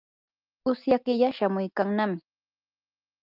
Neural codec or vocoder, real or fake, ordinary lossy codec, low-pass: none; real; Opus, 24 kbps; 5.4 kHz